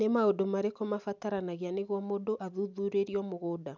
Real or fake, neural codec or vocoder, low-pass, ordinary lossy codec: real; none; 7.2 kHz; none